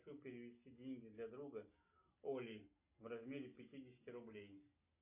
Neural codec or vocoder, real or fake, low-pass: none; real; 3.6 kHz